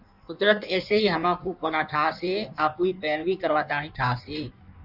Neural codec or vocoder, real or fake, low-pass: codec, 16 kHz in and 24 kHz out, 1.1 kbps, FireRedTTS-2 codec; fake; 5.4 kHz